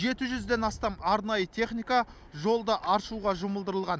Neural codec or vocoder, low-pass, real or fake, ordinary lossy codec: none; none; real; none